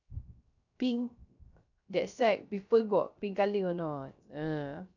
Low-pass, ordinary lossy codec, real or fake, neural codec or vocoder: 7.2 kHz; none; fake; codec, 16 kHz, 0.3 kbps, FocalCodec